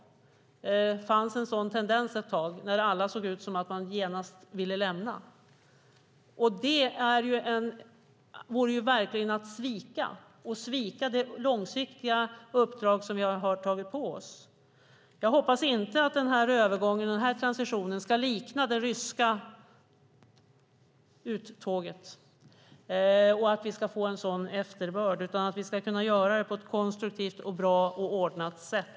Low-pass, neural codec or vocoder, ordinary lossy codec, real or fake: none; none; none; real